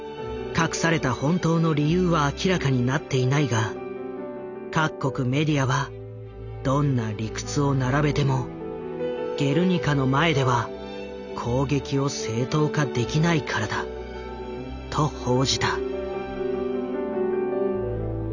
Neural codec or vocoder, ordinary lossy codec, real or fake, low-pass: none; none; real; 7.2 kHz